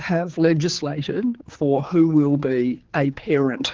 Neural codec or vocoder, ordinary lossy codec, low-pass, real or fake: codec, 16 kHz, 4 kbps, X-Codec, HuBERT features, trained on general audio; Opus, 16 kbps; 7.2 kHz; fake